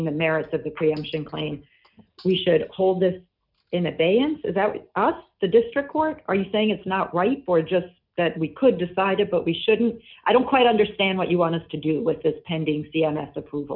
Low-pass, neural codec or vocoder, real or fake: 5.4 kHz; none; real